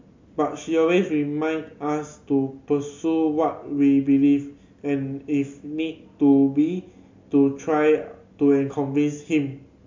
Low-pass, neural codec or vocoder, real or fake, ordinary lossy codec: 7.2 kHz; none; real; MP3, 64 kbps